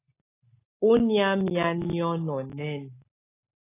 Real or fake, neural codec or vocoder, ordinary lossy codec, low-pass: real; none; AAC, 24 kbps; 3.6 kHz